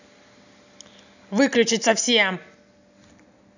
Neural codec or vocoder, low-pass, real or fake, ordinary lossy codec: none; 7.2 kHz; real; none